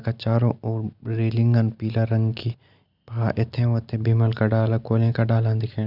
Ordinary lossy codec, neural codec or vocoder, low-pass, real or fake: none; none; 5.4 kHz; real